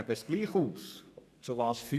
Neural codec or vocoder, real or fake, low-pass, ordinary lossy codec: codec, 32 kHz, 1.9 kbps, SNAC; fake; 14.4 kHz; none